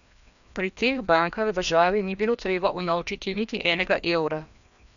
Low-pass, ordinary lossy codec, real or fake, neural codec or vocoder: 7.2 kHz; none; fake; codec, 16 kHz, 1 kbps, FreqCodec, larger model